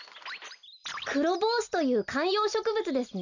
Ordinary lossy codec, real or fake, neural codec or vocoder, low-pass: none; real; none; 7.2 kHz